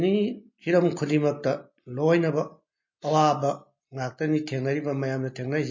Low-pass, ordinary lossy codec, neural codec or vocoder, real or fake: 7.2 kHz; MP3, 32 kbps; none; real